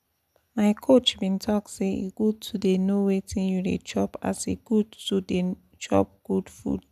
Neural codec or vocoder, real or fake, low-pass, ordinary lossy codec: none; real; 14.4 kHz; none